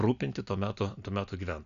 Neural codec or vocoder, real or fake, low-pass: none; real; 7.2 kHz